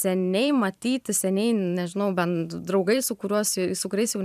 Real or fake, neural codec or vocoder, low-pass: fake; vocoder, 44.1 kHz, 128 mel bands every 512 samples, BigVGAN v2; 14.4 kHz